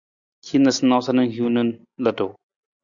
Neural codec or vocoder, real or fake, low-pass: none; real; 7.2 kHz